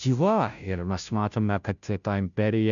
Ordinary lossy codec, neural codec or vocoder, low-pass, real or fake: MP3, 96 kbps; codec, 16 kHz, 0.5 kbps, FunCodec, trained on Chinese and English, 25 frames a second; 7.2 kHz; fake